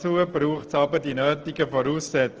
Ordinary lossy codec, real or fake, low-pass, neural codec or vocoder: Opus, 16 kbps; real; 7.2 kHz; none